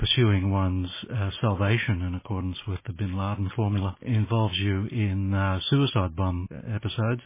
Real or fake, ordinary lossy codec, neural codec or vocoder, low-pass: real; MP3, 16 kbps; none; 3.6 kHz